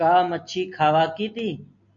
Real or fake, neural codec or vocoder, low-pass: real; none; 7.2 kHz